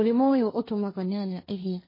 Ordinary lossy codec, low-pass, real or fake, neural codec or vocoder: MP3, 24 kbps; 5.4 kHz; fake; codec, 16 kHz, 1.1 kbps, Voila-Tokenizer